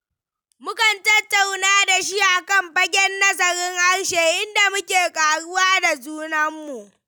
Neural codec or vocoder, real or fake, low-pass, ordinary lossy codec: none; real; none; none